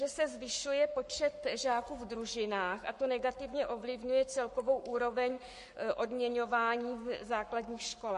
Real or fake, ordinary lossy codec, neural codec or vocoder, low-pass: fake; MP3, 48 kbps; codec, 44.1 kHz, 7.8 kbps, Pupu-Codec; 14.4 kHz